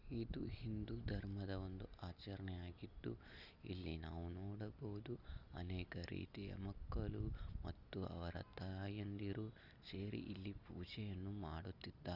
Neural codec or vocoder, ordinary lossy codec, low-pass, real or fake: none; Opus, 32 kbps; 5.4 kHz; real